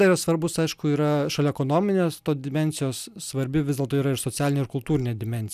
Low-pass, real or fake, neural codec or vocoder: 14.4 kHz; real; none